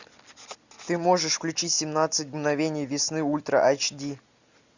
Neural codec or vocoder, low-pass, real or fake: none; 7.2 kHz; real